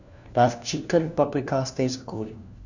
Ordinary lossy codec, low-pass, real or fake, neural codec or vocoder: none; 7.2 kHz; fake; codec, 16 kHz, 1 kbps, FunCodec, trained on LibriTTS, 50 frames a second